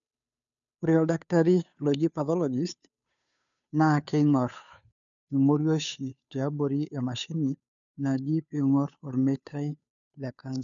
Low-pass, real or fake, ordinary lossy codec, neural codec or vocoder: 7.2 kHz; fake; none; codec, 16 kHz, 2 kbps, FunCodec, trained on Chinese and English, 25 frames a second